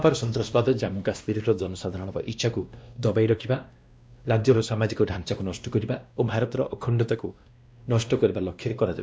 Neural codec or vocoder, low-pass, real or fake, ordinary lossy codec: codec, 16 kHz, 1 kbps, X-Codec, WavLM features, trained on Multilingual LibriSpeech; none; fake; none